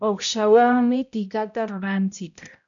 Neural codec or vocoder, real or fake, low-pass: codec, 16 kHz, 0.5 kbps, X-Codec, HuBERT features, trained on balanced general audio; fake; 7.2 kHz